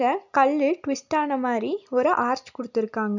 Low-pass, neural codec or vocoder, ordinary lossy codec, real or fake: 7.2 kHz; none; none; real